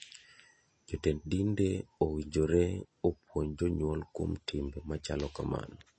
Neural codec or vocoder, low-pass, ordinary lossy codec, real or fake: none; 9.9 kHz; MP3, 32 kbps; real